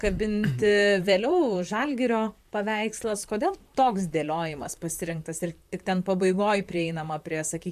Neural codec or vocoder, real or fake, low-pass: vocoder, 44.1 kHz, 128 mel bands, Pupu-Vocoder; fake; 14.4 kHz